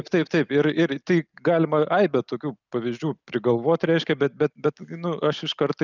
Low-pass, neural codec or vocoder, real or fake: 7.2 kHz; none; real